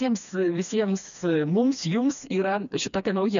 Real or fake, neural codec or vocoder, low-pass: fake; codec, 16 kHz, 2 kbps, FreqCodec, smaller model; 7.2 kHz